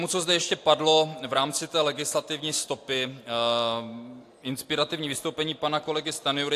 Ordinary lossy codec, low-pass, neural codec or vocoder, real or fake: AAC, 64 kbps; 14.4 kHz; none; real